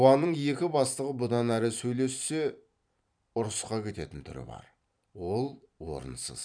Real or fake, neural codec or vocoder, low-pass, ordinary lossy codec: fake; vocoder, 44.1 kHz, 128 mel bands every 512 samples, BigVGAN v2; 9.9 kHz; none